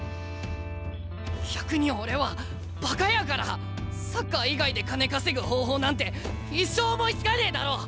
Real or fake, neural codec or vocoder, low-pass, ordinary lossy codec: real; none; none; none